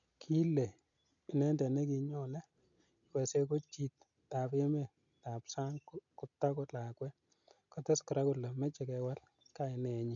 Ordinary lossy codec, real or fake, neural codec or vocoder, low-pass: none; real; none; 7.2 kHz